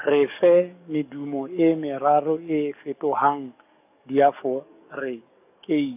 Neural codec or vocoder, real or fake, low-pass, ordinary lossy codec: codec, 44.1 kHz, 7.8 kbps, DAC; fake; 3.6 kHz; AAC, 32 kbps